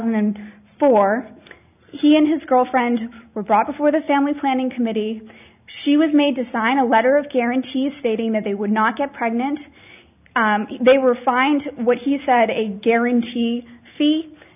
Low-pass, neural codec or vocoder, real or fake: 3.6 kHz; none; real